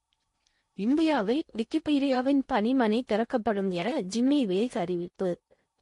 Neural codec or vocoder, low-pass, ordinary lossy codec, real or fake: codec, 16 kHz in and 24 kHz out, 0.6 kbps, FocalCodec, streaming, 2048 codes; 10.8 kHz; MP3, 48 kbps; fake